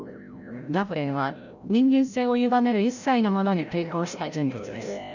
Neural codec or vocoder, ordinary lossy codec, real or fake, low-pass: codec, 16 kHz, 0.5 kbps, FreqCodec, larger model; none; fake; 7.2 kHz